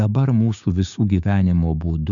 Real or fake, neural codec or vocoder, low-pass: fake; codec, 16 kHz, 6 kbps, DAC; 7.2 kHz